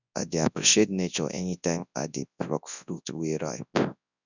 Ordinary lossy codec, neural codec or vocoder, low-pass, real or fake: none; codec, 24 kHz, 0.9 kbps, WavTokenizer, large speech release; 7.2 kHz; fake